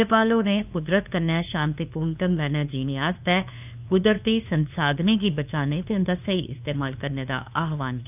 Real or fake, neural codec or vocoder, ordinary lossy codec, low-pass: fake; codec, 16 kHz, 2 kbps, FunCodec, trained on LibriTTS, 25 frames a second; none; 3.6 kHz